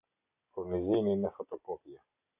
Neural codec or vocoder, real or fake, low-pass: none; real; 3.6 kHz